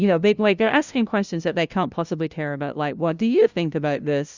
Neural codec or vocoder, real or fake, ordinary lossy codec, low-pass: codec, 16 kHz, 0.5 kbps, FunCodec, trained on LibriTTS, 25 frames a second; fake; Opus, 64 kbps; 7.2 kHz